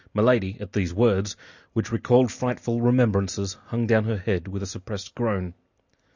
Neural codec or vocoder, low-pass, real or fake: none; 7.2 kHz; real